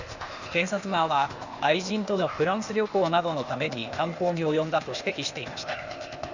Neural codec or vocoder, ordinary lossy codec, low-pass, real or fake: codec, 16 kHz, 0.8 kbps, ZipCodec; Opus, 64 kbps; 7.2 kHz; fake